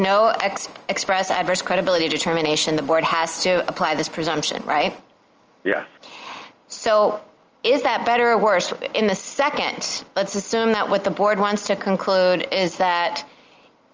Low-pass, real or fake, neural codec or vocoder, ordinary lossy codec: 7.2 kHz; real; none; Opus, 24 kbps